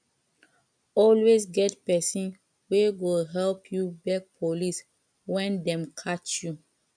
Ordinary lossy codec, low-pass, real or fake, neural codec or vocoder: none; 9.9 kHz; real; none